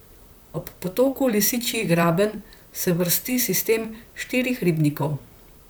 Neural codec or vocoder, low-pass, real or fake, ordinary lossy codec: vocoder, 44.1 kHz, 128 mel bands, Pupu-Vocoder; none; fake; none